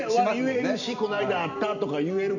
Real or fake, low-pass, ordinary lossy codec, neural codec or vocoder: real; 7.2 kHz; none; none